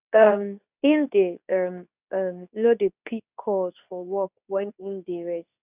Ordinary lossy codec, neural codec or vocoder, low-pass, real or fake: none; codec, 24 kHz, 0.9 kbps, WavTokenizer, medium speech release version 2; 3.6 kHz; fake